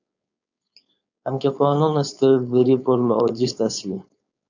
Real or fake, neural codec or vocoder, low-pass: fake; codec, 16 kHz, 4.8 kbps, FACodec; 7.2 kHz